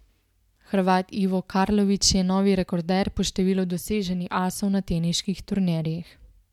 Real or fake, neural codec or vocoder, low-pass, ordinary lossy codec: real; none; 19.8 kHz; MP3, 96 kbps